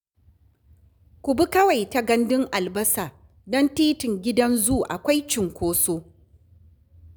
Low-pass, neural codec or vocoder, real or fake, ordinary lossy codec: none; none; real; none